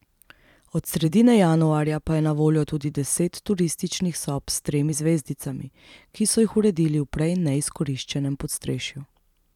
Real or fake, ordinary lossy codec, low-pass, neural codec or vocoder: fake; none; 19.8 kHz; vocoder, 44.1 kHz, 128 mel bands every 256 samples, BigVGAN v2